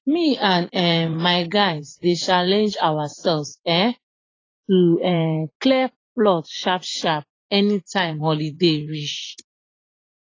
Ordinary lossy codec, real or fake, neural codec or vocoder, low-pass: AAC, 32 kbps; fake; vocoder, 44.1 kHz, 80 mel bands, Vocos; 7.2 kHz